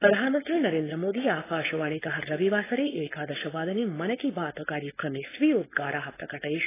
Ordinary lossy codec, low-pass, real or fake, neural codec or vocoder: AAC, 16 kbps; 3.6 kHz; fake; codec, 16 kHz, 4.8 kbps, FACodec